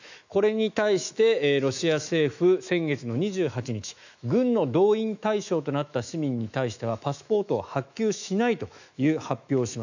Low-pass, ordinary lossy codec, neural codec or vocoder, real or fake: 7.2 kHz; none; autoencoder, 48 kHz, 128 numbers a frame, DAC-VAE, trained on Japanese speech; fake